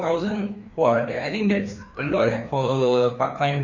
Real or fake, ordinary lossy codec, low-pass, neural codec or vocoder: fake; none; 7.2 kHz; codec, 16 kHz, 2 kbps, FreqCodec, larger model